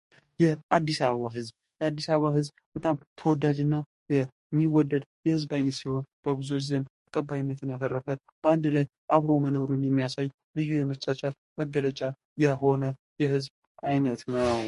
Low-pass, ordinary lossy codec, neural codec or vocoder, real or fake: 14.4 kHz; MP3, 48 kbps; codec, 44.1 kHz, 2.6 kbps, DAC; fake